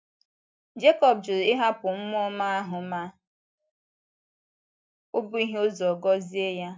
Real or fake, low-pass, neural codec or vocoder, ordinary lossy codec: real; none; none; none